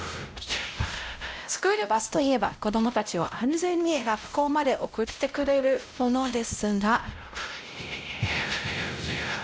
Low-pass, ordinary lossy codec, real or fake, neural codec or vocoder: none; none; fake; codec, 16 kHz, 0.5 kbps, X-Codec, WavLM features, trained on Multilingual LibriSpeech